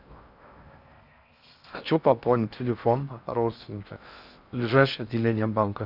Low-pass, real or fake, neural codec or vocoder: 5.4 kHz; fake; codec, 16 kHz in and 24 kHz out, 0.6 kbps, FocalCodec, streaming, 4096 codes